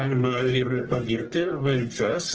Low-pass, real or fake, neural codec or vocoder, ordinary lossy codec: 7.2 kHz; fake; codec, 44.1 kHz, 1.7 kbps, Pupu-Codec; Opus, 16 kbps